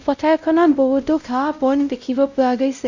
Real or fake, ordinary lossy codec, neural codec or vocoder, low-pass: fake; Opus, 64 kbps; codec, 16 kHz, 0.5 kbps, X-Codec, WavLM features, trained on Multilingual LibriSpeech; 7.2 kHz